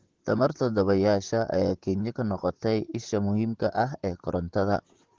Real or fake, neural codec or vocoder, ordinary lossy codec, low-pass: fake; vocoder, 44.1 kHz, 128 mel bands, Pupu-Vocoder; Opus, 16 kbps; 7.2 kHz